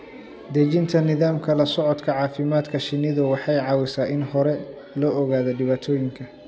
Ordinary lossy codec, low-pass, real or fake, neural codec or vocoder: none; none; real; none